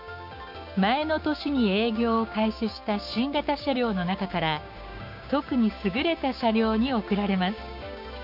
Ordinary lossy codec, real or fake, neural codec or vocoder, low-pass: none; fake; codec, 16 kHz, 6 kbps, DAC; 5.4 kHz